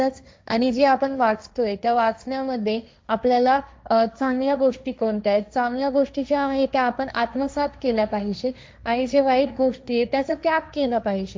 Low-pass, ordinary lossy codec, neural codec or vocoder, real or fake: none; none; codec, 16 kHz, 1.1 kbps, Voila-Tokenizer; fake